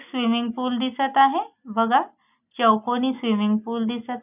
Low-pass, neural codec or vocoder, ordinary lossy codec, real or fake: 3.6 kHz; none; none; real